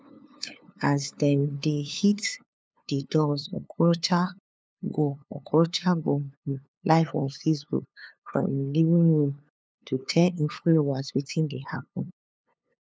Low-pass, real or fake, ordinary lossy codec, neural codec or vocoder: none; fake; none; codec, 16 kHz, 2 kbps, FunCodec, trained on LibriTTS, 25 frames a second